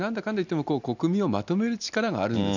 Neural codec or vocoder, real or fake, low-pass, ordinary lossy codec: none; real; 7.2 kHz; none